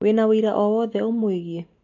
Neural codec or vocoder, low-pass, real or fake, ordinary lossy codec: none; 7.2 kHz; real; AAC, 48 kbps